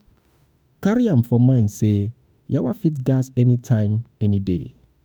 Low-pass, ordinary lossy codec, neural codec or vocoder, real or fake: none; none; autoencoder, 48 kHz, 32 numbers a frame, DAC-VAE, trained on Japanese speech; fake